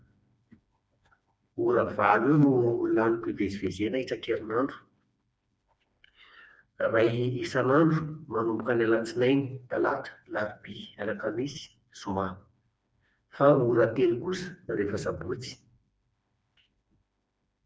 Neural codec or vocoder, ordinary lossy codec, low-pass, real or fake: codec, 16 kHz, 2 kbps, FreqCodec, smaller model; none; none; fake